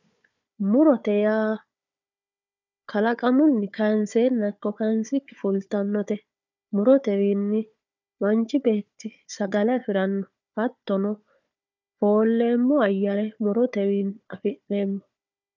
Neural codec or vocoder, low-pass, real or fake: codec, 16 kHz, 4 kbps, FunCodec, trained on Chinese and English, 50 frames a second; 7.2 kHz; fake